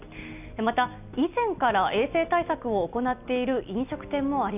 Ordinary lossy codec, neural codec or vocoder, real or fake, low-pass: none; none; real; 3.6 kHz